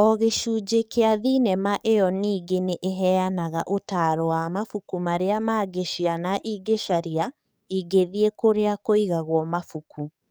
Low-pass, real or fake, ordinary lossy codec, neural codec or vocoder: none; fake; none; codec, 44.1 kHz, 7.8 kbps, DAC